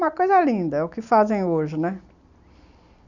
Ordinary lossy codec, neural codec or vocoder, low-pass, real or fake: none; none; 7.2 kHz; real